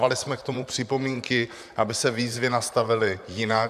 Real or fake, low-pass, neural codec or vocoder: fake; 14.4 kHz; vocoder, 44.1 kHz, 128 mel bands, Pupu-Vocoder